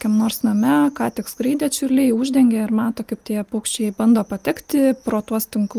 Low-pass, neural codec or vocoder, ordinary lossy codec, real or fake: 14.4 kHz; none; Opus, 32 kbps; real